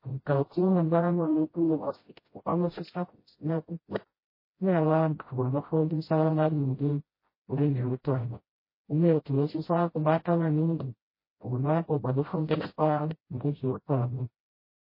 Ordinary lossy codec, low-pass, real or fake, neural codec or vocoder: MP3, 24 kbps; 5.4 kHz; fake; codec, 16 kHz, 0.5 kbps, FreqCodec, smaller model